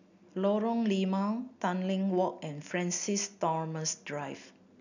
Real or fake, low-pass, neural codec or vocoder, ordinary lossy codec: real; 7.2 kHz; none; none